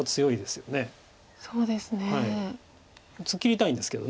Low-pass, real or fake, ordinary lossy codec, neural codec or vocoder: none; real; none; none